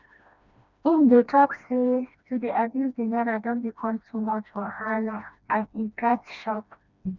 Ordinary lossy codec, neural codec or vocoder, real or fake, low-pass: none; codec, 16 kHz, 1 kbps, FreqCodec, smaller model; fake; 7.2 kHz